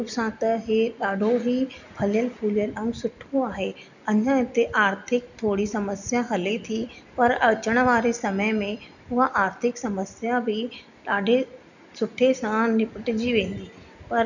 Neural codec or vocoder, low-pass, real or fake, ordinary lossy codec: none; 7.2 kHz; real; none